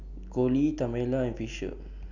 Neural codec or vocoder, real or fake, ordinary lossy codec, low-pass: none; real; none; 7.2 kHz